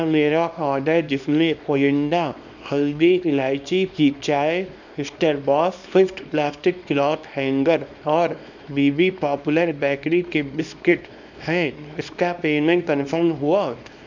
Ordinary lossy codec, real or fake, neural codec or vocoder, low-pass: none; fake; codec, 24 kHz, 0.9 kbps, WavTokenizer, small release; 7.2 kHz